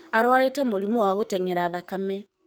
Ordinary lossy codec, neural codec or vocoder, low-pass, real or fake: none; codec, 44.1 kHz, 2.6 kbps, SNAC; none; fake